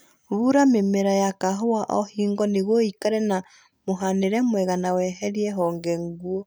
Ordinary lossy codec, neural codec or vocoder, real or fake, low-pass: none; none; real; none